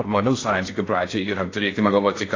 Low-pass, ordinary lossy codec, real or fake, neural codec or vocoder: 7.2 kHz; AAC, 32 kbps; fake; codec, 16 kHz in and 24 kHz out, 0.6 kbps, FocalCodec, streaming, 2048 codes